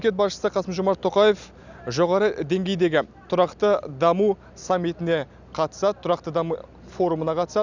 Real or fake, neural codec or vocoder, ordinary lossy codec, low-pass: real; none; none; 7.2 kHz